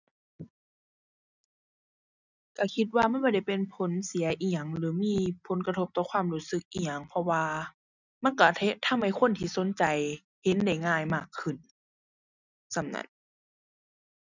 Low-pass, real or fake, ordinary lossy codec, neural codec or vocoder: 7.2 kHz; real; none; none